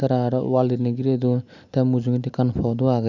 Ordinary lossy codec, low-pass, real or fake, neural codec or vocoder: none; 7.2 kHz; real; none